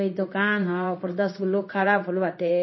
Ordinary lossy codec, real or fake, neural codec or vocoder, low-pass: MP3, 24 kbps; fake; codec, 16 kHz in and 24 kHz out, 1 kbps, XY-Tokenizer; 7.2 kHz